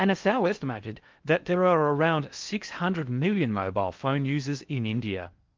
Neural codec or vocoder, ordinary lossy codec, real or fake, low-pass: codec, 16 kHz in and 24 kHz out, 0.6 kbps, FocalCodec, streaming, 4096 codes; Opus, 24 kbps; fake; 7.2 kHz